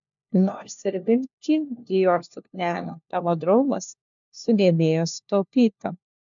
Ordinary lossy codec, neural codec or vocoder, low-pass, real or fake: MP3, 64 kbps; codec, 16 kHz, 1 kbps, FunCodec, trained on LibriTTS, 50 frames a second; 7.2 kHz; fake